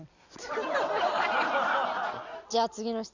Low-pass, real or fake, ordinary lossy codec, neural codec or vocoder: 7.2 kHz; real; none; none